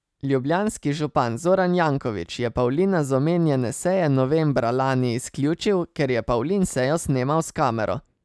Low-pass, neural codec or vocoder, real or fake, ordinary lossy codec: none; none; real; none